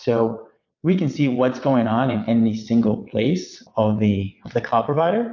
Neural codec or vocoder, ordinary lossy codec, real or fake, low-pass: vocoder, 22.05 kHz, 80 mel bands, WaveNeXt; AAC, 48 kbps; fake; 7.2 kHz